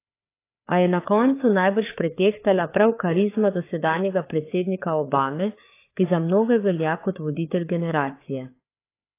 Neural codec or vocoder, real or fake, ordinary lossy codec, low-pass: codec, 16 kHz, 4 kbps, FreqCodec, larger model; fake; AAC, 24 kbps; 3.6 kHz